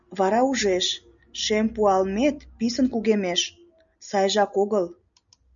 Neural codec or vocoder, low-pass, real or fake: none; 7.2 kHz; real